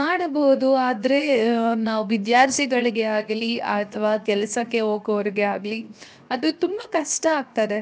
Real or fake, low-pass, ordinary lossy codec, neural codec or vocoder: fake; none; none; codec, 16 kHz, 0.7 kbps, FocalCodec